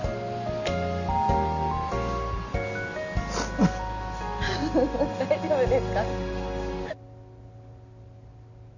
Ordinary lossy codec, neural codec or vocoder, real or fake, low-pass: none; none; real; 7.2 kHz